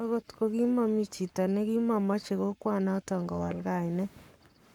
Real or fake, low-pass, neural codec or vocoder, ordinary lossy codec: fake; 19.8 kHz; codec, 44.1 kHz, 7.8 kbps, Pupu-Codec; none